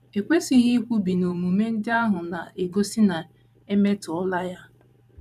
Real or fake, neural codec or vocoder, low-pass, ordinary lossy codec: fake; vocoder, 44.1 kHz, 128 mel bands every 512 samples, BigVGAN v2; 14.4 kHz; none